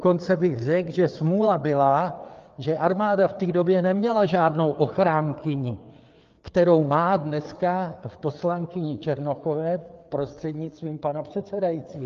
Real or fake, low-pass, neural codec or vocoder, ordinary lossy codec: fake; 7.2 kHz; codec, 16 kHz, 4 kbps, FreqCodec, larger model; Opus, 32 kbps